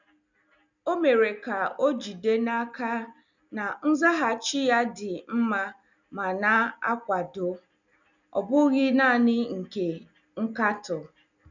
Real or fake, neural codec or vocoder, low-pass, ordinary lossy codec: real; none; 7.2 kHz; none